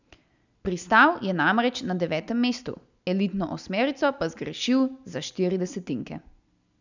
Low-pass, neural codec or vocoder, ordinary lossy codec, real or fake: 7.2 kHz; none; none; real